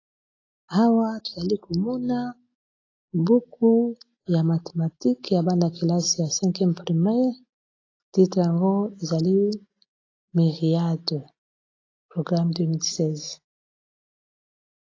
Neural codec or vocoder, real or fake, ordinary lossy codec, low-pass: none; real; AAC, 32 kbps; 7.2 kHz